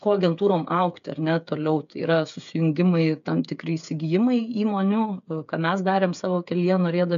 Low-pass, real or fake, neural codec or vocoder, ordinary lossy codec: 7.2 kHz; fake; codec, 16 kHz, 8 kbps, FreqCodec, smaller model; MP3, 96 kbps